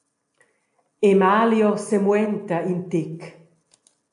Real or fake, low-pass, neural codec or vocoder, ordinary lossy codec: real; 10.8 kHz; none; AAC, 64 kbps